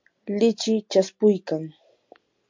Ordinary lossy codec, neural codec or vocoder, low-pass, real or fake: MP3, 48 kbps; none; 7.2 kHz; real